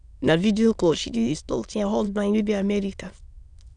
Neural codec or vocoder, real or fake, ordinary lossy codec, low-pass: autoencoder, 22.05 kHz, a latent of 192 numbers a frame, VITS, trained on many speakers; fake; none; 9.9 kHz